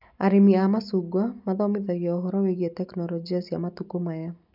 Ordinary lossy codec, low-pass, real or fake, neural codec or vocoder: none; 5.4 kHz; real; none